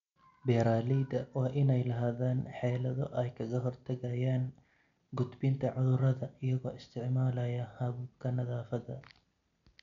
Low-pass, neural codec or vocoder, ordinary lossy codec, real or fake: 7.2 kHz; none; MP3, 64 kbps; real